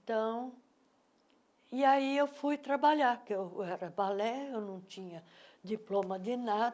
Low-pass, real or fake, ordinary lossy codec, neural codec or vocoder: none; real; none; none